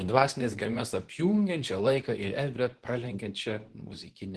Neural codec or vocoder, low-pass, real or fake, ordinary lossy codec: codec, 24 kHz, 0.9 kbps, WavTokenizer, medium speech release version 2; 10.8 kHz; fake; Opus, 16 kbps